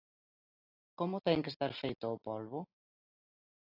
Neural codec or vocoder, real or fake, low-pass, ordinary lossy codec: none; real; 5.4 kHz; AAC, 48 kbps